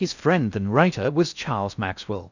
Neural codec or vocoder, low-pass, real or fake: codec, 16 kHz in and 24 kHz out, 0.6 kbps, FocalCodec, streaming, 4096 codes; 7.2 kHz; fake